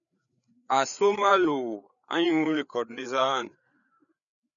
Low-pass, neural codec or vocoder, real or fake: 7.2 kHz; codec, 16 kHz, 4 kbps, FreqCodec, larger model; fake